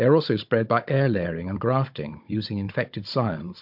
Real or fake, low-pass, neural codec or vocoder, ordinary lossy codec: fake; 5.4 kHz; codec, 16 kHz, 16 kbps, FunCodec, trained on Chinese and English, 50 frames a second; MP3, 48 kbps